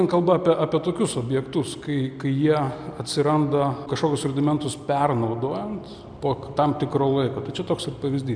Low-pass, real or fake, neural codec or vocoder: 9.9 kHz; real; none